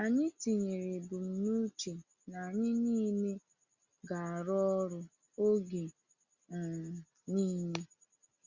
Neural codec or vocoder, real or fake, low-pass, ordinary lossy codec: none; real; 7.2 kHz; Opus, 64 kbps